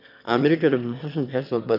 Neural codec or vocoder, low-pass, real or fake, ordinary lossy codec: autoencoder, 22.05 kHz, a latent of 192 numbers a frame, VITS, trained on one speaker; 5.4 kHz; fake; none